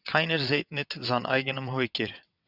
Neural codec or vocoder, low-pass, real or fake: vocoder, 22.05 kHz, 80 mel bands, WaveNeXt; 5.4 kHz; fake